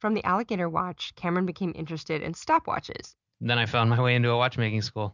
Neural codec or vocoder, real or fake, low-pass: none; real; 7.2 kHz